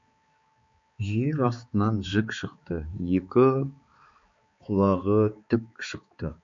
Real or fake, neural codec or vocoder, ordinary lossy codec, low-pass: fake; codec, 16 kHz, 4 kbps, X-Codec, HuBERT features, trained on balanced general audio; MP3, 48 kbps; 7.2 kHz